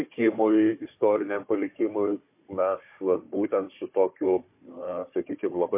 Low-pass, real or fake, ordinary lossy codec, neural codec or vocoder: 3.6 kHz; fake; MP3, 24 kbps; codec, 16 kHz, 4 kbps, FunCodec, trained on Chinese and English, 50 frames a second